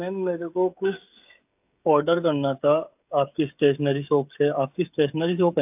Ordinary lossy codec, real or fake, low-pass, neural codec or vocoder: AAC, 32 kbps; fake; 3.6 kHz; codec, 16 kHz, 16 kbps, FreqCodec, smaller model